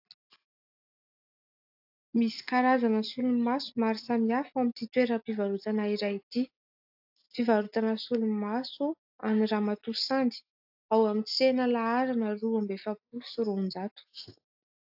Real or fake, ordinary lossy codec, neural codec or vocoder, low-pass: real; AAC, 48 kbps; none; 5.4 kHz